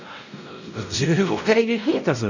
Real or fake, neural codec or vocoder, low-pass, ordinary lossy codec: fake; codec, 16 kHz, 0.5 kbps, X-Codec, WavLM features, trained on Multilingual LibriSpeech; 7.2 kHz; none